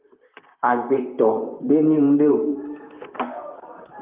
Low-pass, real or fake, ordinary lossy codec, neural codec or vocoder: 3.6 kHz; fake; Opus, 32 kbps; vocoder, 44.1 kHz, 128 mel bands, Pupu-Vocoder